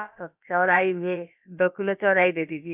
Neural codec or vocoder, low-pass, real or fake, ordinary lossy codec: codec, 16 kHz, about 1 kbps, DyCAST, with the encoder's durations; 3.6 kHz; fake; none